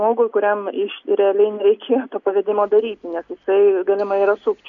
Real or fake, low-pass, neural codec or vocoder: real; 7.2 kHz; none